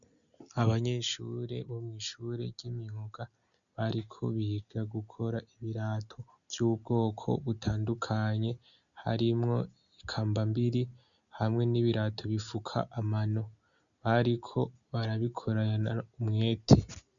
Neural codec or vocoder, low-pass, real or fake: none; 7.2 kHz; real